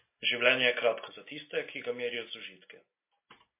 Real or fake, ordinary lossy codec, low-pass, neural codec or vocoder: real; MP3, 24 kbps; 3.6 kHz; none